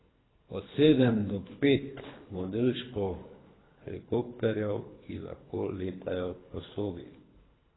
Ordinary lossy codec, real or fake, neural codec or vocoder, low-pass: AAC, 16 kbps; fake; codec, 24 kHz, 3 kbps, HILCodec; 7.2 kHz